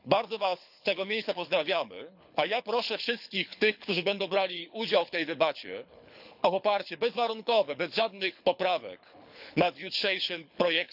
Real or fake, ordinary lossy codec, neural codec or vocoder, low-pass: fake; none; codec, 24 kHz, 6 kbps, HILCodec; 5.4 kHz